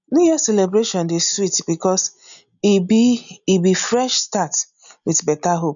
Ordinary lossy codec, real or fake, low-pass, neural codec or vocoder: none; real; 7.2 kHz; none